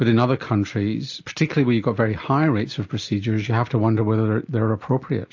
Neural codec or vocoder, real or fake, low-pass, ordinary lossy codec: none; real; 7.2 kHz; AAC, 48 kbps